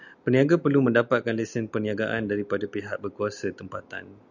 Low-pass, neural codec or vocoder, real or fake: 7.2 kHz; none; real